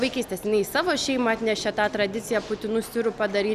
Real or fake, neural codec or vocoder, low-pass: real; none; 14.4 kHz